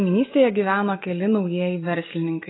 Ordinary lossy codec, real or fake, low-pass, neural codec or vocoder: AAC, 16 kbps; real; 7.2 kHz; none